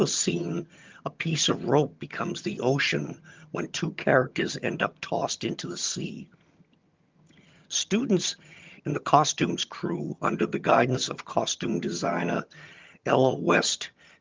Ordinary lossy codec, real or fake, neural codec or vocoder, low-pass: Opus, 32 kbps; fake; vocoder, 22.05 kHz, 80 mel bands, HiFi-GAN; 7.2 kHz